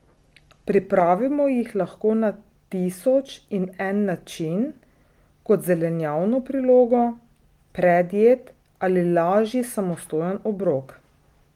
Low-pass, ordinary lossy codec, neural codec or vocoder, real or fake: 19.8 kHz; Opus, 24 kbps; none; real